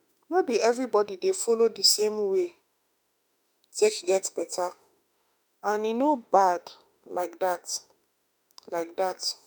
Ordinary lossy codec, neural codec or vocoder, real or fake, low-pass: none; autoencoder, 48 kHz, 32 numbers a frame, DAC-VAE, trained on Japanese speech; fake; none